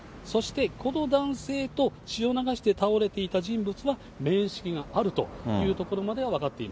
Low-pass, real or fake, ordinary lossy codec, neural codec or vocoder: none; real; none; none